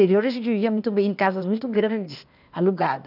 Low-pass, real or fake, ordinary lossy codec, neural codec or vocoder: 5.4 kHz; fake; none; codec, 16 kHz, 0.8 kbps, ZipCodec